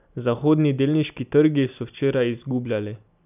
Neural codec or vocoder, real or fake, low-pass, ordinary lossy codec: none; real; 3.6 kHz; none